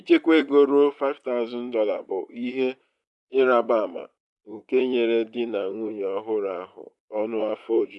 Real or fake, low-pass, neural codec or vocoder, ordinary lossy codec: fake; 10.8 kHz; vocoder, 44.1 kHz, 128 mel bands, Pupu-Vocoder; none